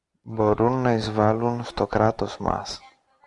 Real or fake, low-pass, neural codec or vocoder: real; 10.8 kHz; none